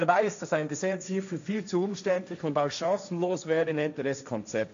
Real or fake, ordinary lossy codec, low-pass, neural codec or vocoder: fake; MP3, 64 kbps; 7.2 kHz; codec, 16 kHz, 1.1 kbps, Voila-Tokenizer